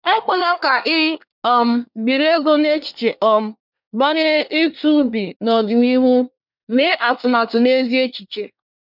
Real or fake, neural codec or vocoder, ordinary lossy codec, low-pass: fake; codec, 24 kHz, 1 kbps, SNAC; none; 5.4 kHz